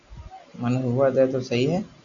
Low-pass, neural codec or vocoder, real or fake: 7.2 kHz; none; real